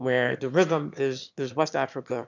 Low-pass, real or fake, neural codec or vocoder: 7.2 kHz; fake; autoencoder, 22.05 kHz, a latent of 192 numbers a frame, VITS, trained on one speaker